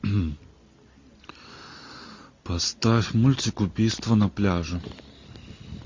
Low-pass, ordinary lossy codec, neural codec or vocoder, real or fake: 7.2 kHz; MP3, 48 kbps; none; real